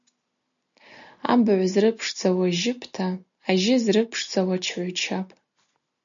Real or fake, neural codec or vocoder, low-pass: real; none; 7.2 kHz